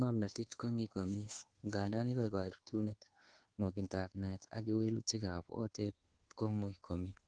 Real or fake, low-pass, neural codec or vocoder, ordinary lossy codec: fake; 9.9 kHz; codec, 24 kHz, 1.2 kbps, DualCodec; Opus, 16 kbps